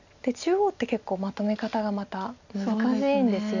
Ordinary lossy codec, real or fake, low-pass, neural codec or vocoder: none; real; 7.2 kHz; none